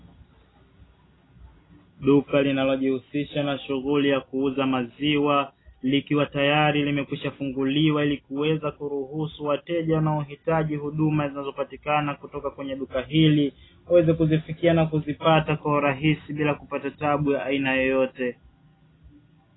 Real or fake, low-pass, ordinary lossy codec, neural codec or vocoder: real; 7.2 kHz; AAC, 16 kbps; none